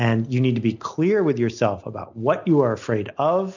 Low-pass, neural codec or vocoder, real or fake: 7.2 kHz; none; real